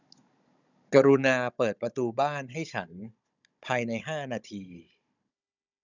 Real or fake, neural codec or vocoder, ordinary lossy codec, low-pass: fake; codec, 16 kHz, 16 kbps, FunCodec, trained on Chinese and English, 50 frames a second; none; 7.2 kHz